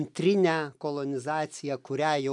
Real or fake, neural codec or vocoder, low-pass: real; none; 10.8 kHz